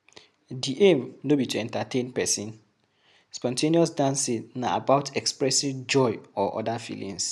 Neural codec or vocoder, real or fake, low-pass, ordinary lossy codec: none; real; none; none